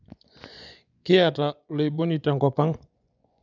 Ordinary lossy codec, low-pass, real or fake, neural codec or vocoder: none; 7.2 kHz; fake; vocoder, 24 kHz, 100 mel bands, Vocos